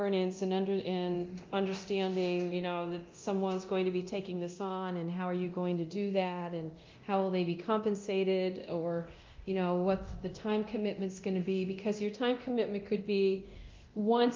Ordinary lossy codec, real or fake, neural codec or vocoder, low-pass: Opus, 24 kbps; fake; codec, 24 kHz, 0.9 kbps, DualCodec; 7.2 kHz